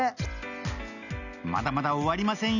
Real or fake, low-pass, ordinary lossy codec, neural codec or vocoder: real; 7.2 kHz; none; none